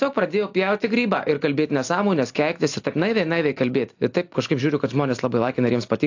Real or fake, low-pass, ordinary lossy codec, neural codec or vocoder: real; 7.2 kHz; AAC, 48 kbps; none